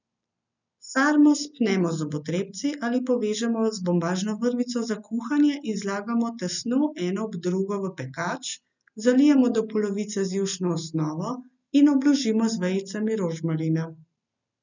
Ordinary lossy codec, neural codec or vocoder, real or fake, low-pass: none; none; real; 7.2 kHz